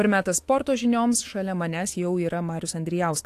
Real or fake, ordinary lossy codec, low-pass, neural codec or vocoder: fake; AAC, 64 kbps; 14.4 kHz; autoencoder, 48 kHz, 128 numbers a frame, DAC-VAE, trained on Japanese speech